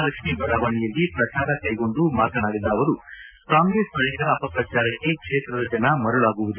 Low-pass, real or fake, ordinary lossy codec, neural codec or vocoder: 3.6 kHz; real; none; none